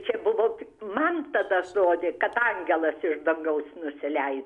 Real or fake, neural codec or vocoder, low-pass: real; none; 10.8 kHz